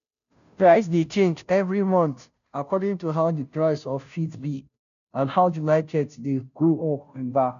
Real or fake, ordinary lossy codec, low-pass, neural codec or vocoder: fake; MP3, 96 kbps; 7.2 kHz; codec, 16 kHz, 0.5 kbps, FunCodec, trained on Chinese and English, 25 frames a second